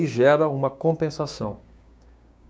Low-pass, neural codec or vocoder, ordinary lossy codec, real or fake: none; codec, 16 kHz, 6 kbps, DAC; none; fake